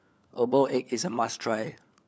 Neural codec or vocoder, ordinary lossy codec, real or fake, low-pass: codec, 16 kHz, 4 kbps, FunCodec, trained on LibriTTS, 50 frames a second; none; fake; none